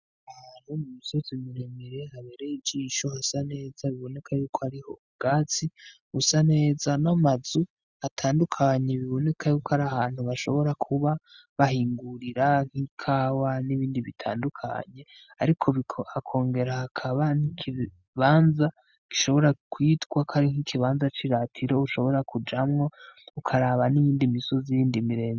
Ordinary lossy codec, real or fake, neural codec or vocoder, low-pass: Opus, 64 kbps; real; none; 7.2 kHz